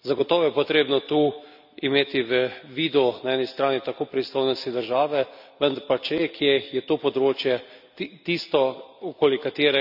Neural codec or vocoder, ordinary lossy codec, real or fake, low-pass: none; none; real; 5.4 kHz